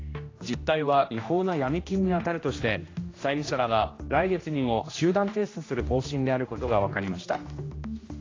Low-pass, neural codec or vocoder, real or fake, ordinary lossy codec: 7.2 kHz; codec, 16 kHz, 1 kbps, X-Codec, HuBERT features, trained on general audio; fake; AAC, 32 kbps